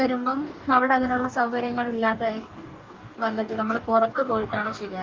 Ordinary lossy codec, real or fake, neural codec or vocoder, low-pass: Opus, 24 kbps; fake; codec, 44.1 kHz, 2.6 kbps, DAC; 7.2 kHz